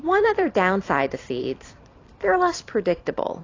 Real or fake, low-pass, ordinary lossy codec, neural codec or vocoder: fake; 7.2 kHz; AAC, 32 kbps; vocoder, 44.1 kHz, 128 mel bands every 256 samples, BigVGAN v2